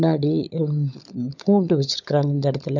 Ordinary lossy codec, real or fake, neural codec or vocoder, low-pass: none; fake; codec, 16 kHz, 16 kbps, FunCodec, trained on LibriTTS, 50 frames a second; 7.2 kHz